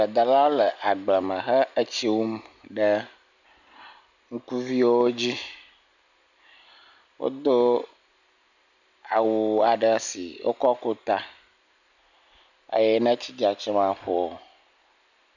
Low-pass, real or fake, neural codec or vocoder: 7.2 kHz; real; none